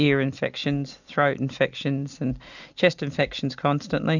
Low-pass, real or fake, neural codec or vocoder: 7.2 kHz; real; none